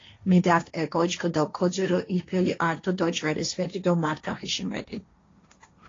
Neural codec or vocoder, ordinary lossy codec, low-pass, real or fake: codec, 16 kHz, 1.1 kbps, Voila-Tokenizer; AAC, 32 kbps; 7.2 kHz; fake